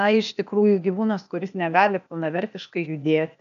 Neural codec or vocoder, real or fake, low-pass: codec, 16 kHz, 0.8 kbps, ZipCodec; fake; 7.2 kHz